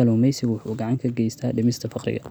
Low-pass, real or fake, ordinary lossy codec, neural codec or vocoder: none; real; none; none